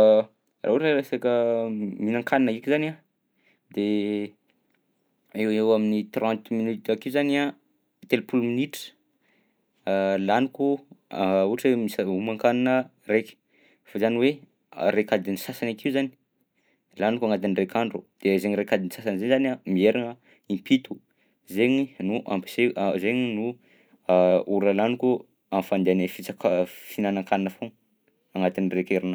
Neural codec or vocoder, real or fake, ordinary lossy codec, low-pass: none; real; none; none